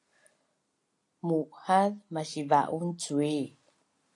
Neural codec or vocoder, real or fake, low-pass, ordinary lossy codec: none; real; 10.8 kHz; AAC, 48 kbps